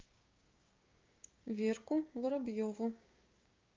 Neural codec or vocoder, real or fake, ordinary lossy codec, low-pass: codec, 16 kHz, 6 kbps, DAC; fake; Opus, 24 kbps; 7.2 kHz